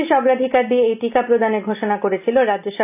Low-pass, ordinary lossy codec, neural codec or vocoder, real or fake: 3.6 kHz; none; none; real